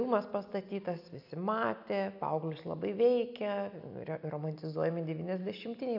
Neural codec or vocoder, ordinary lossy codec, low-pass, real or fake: none; AAC, 48 kbps; 5.4 kHz; real